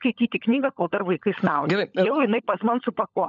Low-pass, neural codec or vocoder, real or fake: 7.2 kHz; codec, 16 kHz, 16 kbps, FunCodec, trained on LibriTTS, 50 frames a second; fake